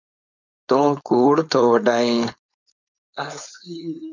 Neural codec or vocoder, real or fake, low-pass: codec, 16 kHz, 4.8 kbps, FACodec; fake; 7.2 kHz